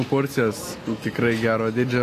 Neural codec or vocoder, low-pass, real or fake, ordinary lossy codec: none; 14.4 kHz; real; AAC, 48 kbps